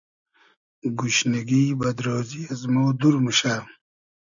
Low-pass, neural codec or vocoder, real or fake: 7.2 kHz; none; real